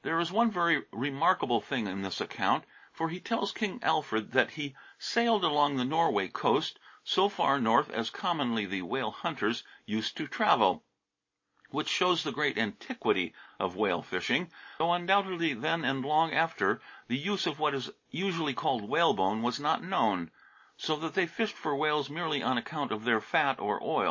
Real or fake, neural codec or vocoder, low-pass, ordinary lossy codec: real; none; 7.2 kHz; MP3, 32 kbps